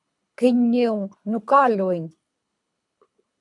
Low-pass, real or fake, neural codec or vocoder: 10.8 kHz; fake; codec, 24 kHz, 3 kbps, HILCodec